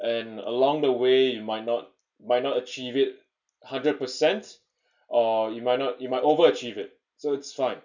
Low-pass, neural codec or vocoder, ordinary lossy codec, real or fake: 7.2 kHz; none; none; real